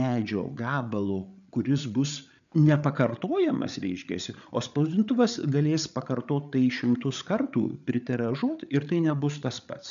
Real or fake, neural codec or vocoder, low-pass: fake; codec, 16 kHz, 8 kbps, FreqCodec, larger model; 7.2 kHz